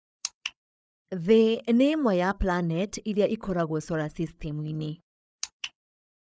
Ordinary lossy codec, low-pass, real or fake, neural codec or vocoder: none; none; fake; codec, 16 kHz, 8 kbps, FreqCodec, larger model